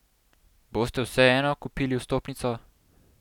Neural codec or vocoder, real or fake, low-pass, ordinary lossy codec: none; real; 19.8 kHz; none